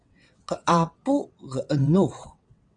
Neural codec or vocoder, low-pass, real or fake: vocoder, 22.05 kHz, 80 mel bands, WaveNeXt; 9.9 kHz; fake